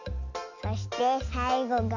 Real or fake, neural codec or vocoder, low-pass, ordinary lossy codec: real; none; 7.2 kHz; AAC, 48 kbps